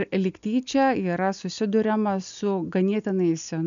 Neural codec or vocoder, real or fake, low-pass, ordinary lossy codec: none; real; 7.2 kHz; MP3, 96 kbps